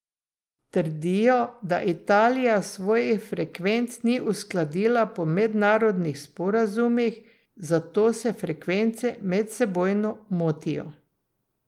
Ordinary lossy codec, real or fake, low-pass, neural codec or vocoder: Opus, 32 kbps; real; 19.8 kHz; none